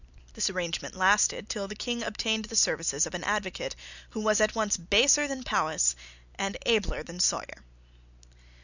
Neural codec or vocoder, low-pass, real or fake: none; 7.2 kHz; real